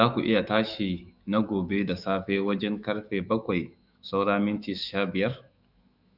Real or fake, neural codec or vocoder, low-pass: fake; autoencoder, 48 kHz, 128 numbers a frame, DAC-VAE, trained on Japanese speech; 5.4 kHz